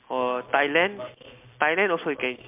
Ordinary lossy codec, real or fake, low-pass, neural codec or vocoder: MP3, 32 kbps; real; 3.6 kHz; none